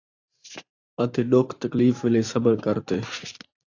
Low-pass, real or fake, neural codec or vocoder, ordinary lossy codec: 7.2 kHz; fake; vocoder, 44.1 kHz, 128 mel bands every 512 samples, BigVGAN v2; AAC, 48 kbps